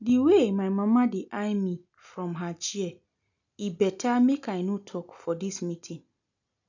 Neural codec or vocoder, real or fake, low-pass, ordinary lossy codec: none; real; 7.2 kHz; none